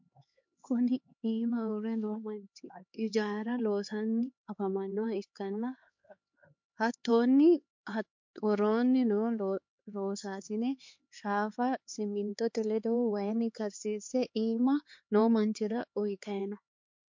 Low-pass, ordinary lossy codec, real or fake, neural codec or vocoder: 7.2 kHz; MP3, 48 kbps; fake; codec, 16 kHz, 4 kbps, X-Codec, HuBERT features, trained on LibriSpeech